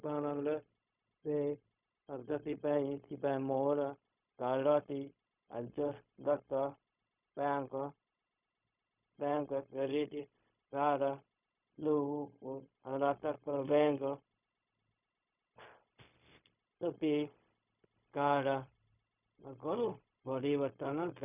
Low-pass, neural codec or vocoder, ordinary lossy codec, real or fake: 3.6 kHz; codec, 16 kHz, 0.4 kbps, LongCat-Audio-Codec; none; fake